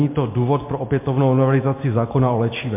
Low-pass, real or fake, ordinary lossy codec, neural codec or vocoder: 3.6 kHz; real; MP3, 24 kbps; none